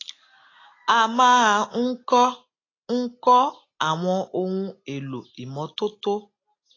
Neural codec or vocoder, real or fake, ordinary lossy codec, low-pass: none; real; AAC, 32 kbps; 7.2 kHz